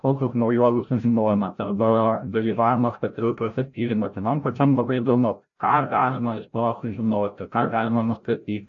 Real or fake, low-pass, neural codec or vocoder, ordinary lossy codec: fake; 7.2 kHz; codec, 16 kHz, 0.5 kbps, FreqCodec, larger model; AAC, 64 kbps